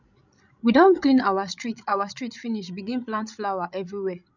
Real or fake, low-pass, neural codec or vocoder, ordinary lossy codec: fake; 7.2 kHz; codec, 16 kHz, 16 kbps, FreqCodec, larger model; none